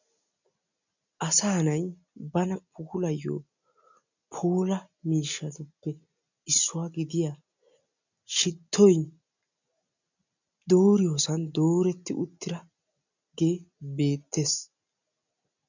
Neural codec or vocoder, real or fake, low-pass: none; real; 7.2 kHz